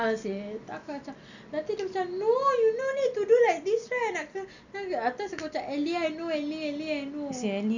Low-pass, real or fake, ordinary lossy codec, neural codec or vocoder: 7.2 kHz; real; none; none